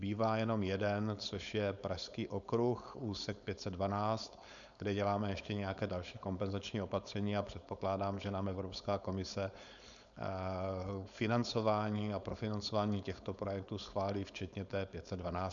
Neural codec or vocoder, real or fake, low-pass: codec, 16 kHz, 4.8 kbps, FACodec; fake; 7.2 kHz